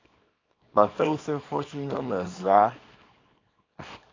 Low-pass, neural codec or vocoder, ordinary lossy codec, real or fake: 7.2 kHz; codec, 24 kHz, 0.9 kbps, WavTokenizer, small release; AAC, 32 kbps; fake